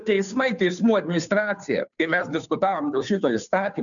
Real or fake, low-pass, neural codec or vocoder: fake; 7.2 kHz; codec, 16 kHz, 2 kbps, FunCodec, trained on Chinese and English, 25 frames a second